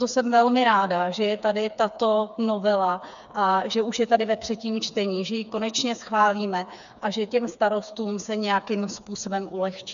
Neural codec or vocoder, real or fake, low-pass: codec, 16 kHz, 4 kbps, FreqCodec, smaller model; fake; 7.2 kHz